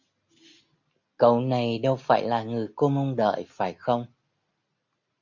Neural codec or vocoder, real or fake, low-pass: none; real; 7.2 kHz